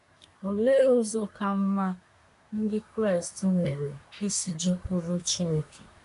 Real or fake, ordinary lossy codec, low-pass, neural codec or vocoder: fake; none; 10.8 kHz; codec, 24 kHz, 1 kbps, SNAC